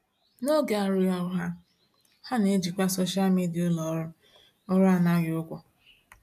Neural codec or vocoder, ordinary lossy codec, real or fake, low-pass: none; AAC, 96 kbps; real; 14.4 kHz